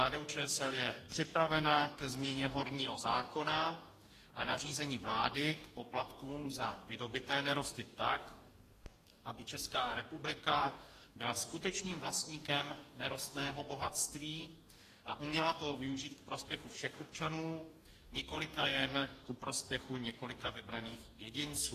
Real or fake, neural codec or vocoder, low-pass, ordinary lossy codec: fake; codec, 44.1 kHz, 2.6 kbps, DAC; 14.4 kHz; AAC, 48 kbps